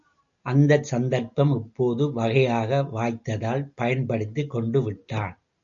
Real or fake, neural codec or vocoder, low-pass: real; none; 7.2 kHz